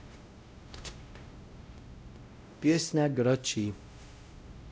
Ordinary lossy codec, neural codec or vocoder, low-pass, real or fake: none; codec, 16 kHz, 0.5 kbps, X-Codec, WavLM features, trained on Multilingual LibriSpeech; none; fake